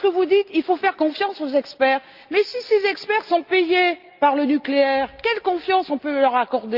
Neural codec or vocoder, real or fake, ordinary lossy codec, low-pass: none; real; Opus, 24 kbps; 5.4 kHz